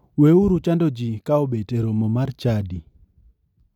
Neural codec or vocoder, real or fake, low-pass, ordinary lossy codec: none; real; 19.8 kHz; none